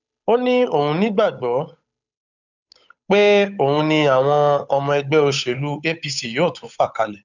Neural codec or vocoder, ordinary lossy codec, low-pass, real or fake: codec, 16 kHz, 8 kbps, FunCodec, trained on Chinese and English, 25 frames a second; none; 7.2 kHz; fake